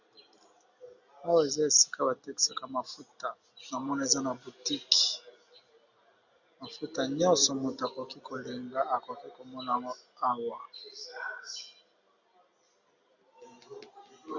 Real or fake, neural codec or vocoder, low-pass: real; none; 7.2 kHz